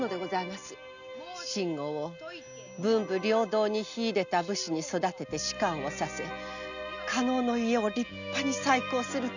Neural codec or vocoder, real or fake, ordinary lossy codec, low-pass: none; real; none; 7.2 kHz